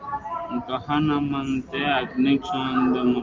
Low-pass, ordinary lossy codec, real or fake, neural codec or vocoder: 7.2 kHz; Opus, 24 kbps; real; none